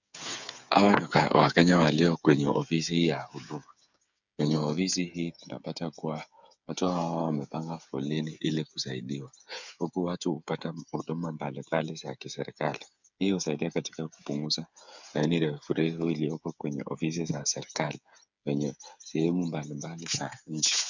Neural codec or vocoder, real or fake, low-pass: codec, 16 kHz, 8 kbps, FreqCodec, smaller model; fake; 7.2 kHz